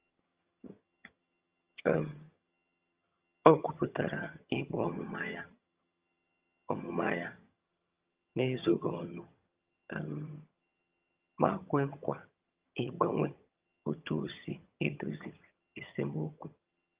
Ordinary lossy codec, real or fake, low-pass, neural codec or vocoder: Opus, 24 kbps; fake; 3.6 kHz; vocoder, 22.05 kHz, 80 mel bands, HiFi-GAN